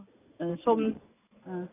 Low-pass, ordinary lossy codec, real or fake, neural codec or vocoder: 3.6 kHz; AAC, 16 kbps; real; none